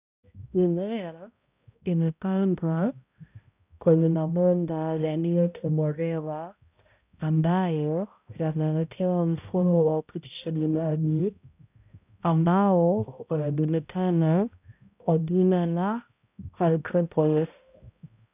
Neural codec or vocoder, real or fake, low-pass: codec, 16 kHz, 0.5 kbps, X-Codec, HuBERT features, trained on balanced general audio; fake; 3.6 kHz